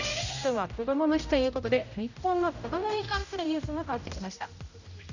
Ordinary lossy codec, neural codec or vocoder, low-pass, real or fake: none; codec, 16 kHz, 0.5 kbps, X-Codec, HuBERT features, trained on general audio; 7.2 kHz; fake